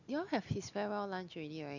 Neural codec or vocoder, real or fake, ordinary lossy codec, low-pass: none; real; none; 7.2 kHz